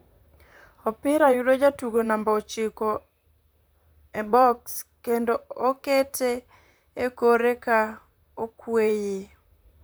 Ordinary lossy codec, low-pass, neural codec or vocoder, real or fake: none; none; vocoder, 44.1 kHz, 128 mel bands, Pupu-Vocoder; fake